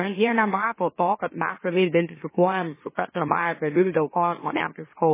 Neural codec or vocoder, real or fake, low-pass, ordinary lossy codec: autoencoder, 44.1 kHz, a latent of 192 numbers a frame, MeloTTS; fake; 3.6 kHz; MP3, 16 kbps